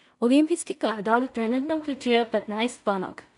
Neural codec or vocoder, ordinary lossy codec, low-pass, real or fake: codec, 16 kHz in and 24 kHz out, 0.4 kbps, LongCat-Audio-Codec, two codebook decoder; none; 10.8 kHz; fake